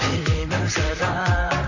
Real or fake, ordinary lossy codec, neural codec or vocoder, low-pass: fake; none; vocoder, 44.1 kHz, 128 mel bands, Pupu-Vocoder; 7.2 kHz